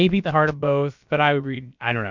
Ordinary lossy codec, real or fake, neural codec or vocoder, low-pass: MP3, 64 kbps; fake; codec, 16 kHz, about 1 kbps, DyCAST, with the encoder's durations; 7.2 kHz